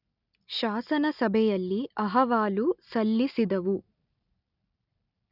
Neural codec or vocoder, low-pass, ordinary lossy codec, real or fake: none; 5.4 kHz; none; real